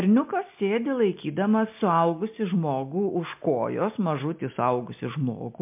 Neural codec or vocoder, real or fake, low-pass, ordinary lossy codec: none; real; 3.6 kHz; MP3, 32 kbps